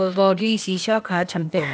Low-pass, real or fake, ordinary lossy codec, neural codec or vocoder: none; fake; none; codec, 16 kHz, 0.8 kbps, ZipCodec